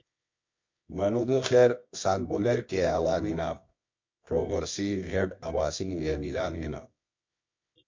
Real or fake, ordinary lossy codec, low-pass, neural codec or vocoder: fake; MP3, 48 kbps; 7.2 kHz; codec, 24 kHz, 0.9 kbps, WavTokenizer, medium music audio release